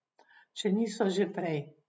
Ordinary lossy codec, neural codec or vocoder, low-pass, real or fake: none; none; none; real